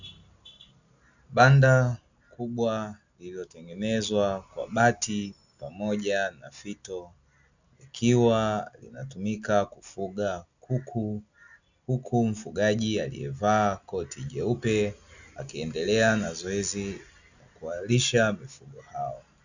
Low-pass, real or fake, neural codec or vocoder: 7.2 kHz; real; none